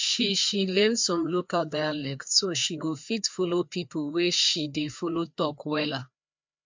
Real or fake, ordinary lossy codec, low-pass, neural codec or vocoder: fake; MP3, 64 kbps; 7.2 kHz; codec, 16 kHz, 2 kbps, FreqCodec, larger model